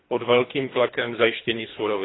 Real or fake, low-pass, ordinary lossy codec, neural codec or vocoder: fake; 7.2 kHz; AAC, 16 kbps; codec, 24 kHz, 3 kbps, HILCodec